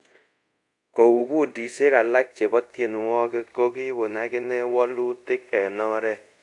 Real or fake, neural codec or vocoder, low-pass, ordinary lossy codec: fake; codec, 24 kHz, 0.5 kbps, DualCodec; 10.8 kHz; AAC, 64 kbps